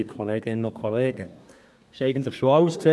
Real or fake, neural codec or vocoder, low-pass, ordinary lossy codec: fake; codec, 24 kHz, 1 kbps, SNAC; none; none